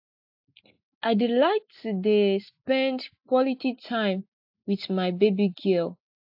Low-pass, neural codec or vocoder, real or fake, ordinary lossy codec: 5.4 kHz; none; real; AAC, 48 kbps